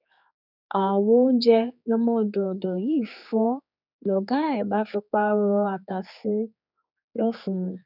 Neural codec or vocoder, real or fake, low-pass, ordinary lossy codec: codec, 16 kHz, 4 kbps, X-Codec, HuBERT features, trained on general audio; fake; 5.4 kHz; none